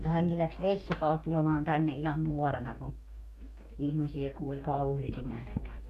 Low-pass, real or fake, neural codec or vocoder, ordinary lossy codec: 14.4 kHz; fake; codec, 44.1 kHz, 2.6 kbps, DAC; AAC, 96 kbps